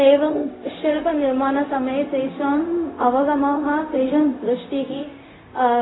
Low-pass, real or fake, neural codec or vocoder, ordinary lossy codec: 7.2 kHz; fake; codec, 16 kHz, 0.4 kbps, LongCat-Audio-Codec; AAC, 16 kbps